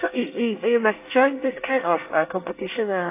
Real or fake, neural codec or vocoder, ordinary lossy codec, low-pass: fake; codec, 24 kHz, 1 kbps, SNAC; AAC, 24 kbps; 3.6 kHz